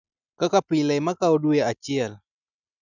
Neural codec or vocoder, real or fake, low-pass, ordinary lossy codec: none; real; 7.2 kHz; none